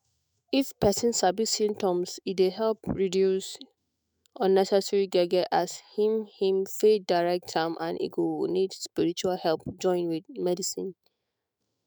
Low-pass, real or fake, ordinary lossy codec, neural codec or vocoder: none; fake; none; autoencoder, 48 kHz, 128 numbers a frame, DAC-VAE, trained on Japanese speech